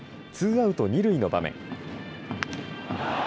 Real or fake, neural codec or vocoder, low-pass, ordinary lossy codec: real; none; none; none